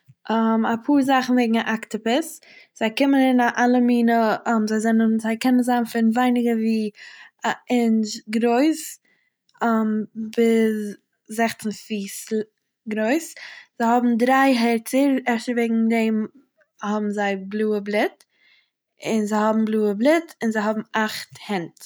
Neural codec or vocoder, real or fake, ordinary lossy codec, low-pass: none; real; none; none